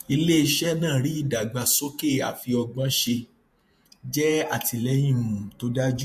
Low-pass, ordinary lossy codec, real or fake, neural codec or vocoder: 14.4 kHz; MP3, 64 kbps; fake; vocoder, 48 kHz, 128 mel bands, Vocos